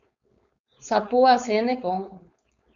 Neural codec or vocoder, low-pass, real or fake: codec, 16 kHz, 4.8 kbps, FACodec; 7.2 kHz; fake